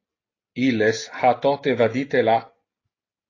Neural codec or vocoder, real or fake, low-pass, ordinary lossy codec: none; real; 7.2 kHz; AAC, 32 kbps